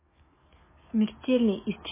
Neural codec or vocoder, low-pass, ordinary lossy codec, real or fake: codec, 44.1 kHz, 7.8 kbps, DAC; 3.6 kHz; MP3, 16 kbps; fake